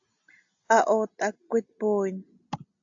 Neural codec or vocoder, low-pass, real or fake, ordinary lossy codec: none; 7.2 kHz; real; AAC, 64 kbps